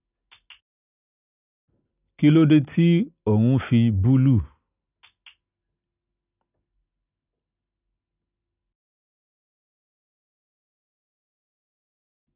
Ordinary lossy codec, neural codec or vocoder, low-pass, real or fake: none; none; 3.6 kHz; real